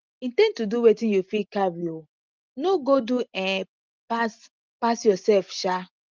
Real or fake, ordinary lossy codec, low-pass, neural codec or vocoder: real; Opus, 32 kbps; 7.2 kHz; none